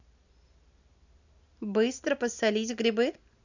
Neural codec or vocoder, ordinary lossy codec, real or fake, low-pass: none; none; real; 7.2 kHz